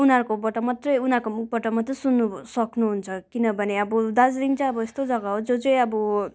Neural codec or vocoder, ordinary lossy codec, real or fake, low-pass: none; none; real; none